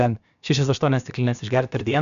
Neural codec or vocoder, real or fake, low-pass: codec, 16 kHz, about 1 kbps, DyCAST, with the encoder's durations; fake; 7.2 kHz